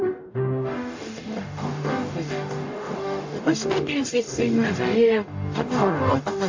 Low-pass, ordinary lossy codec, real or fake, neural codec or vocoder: 7.2 kHz; AAC, 48 kbps; fake; codec, 44.1 kHz, 0.9 kbps, DAC